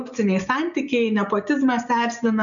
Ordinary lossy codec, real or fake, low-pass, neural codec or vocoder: MP3, 96 kbps; real; 7.2 kHz; none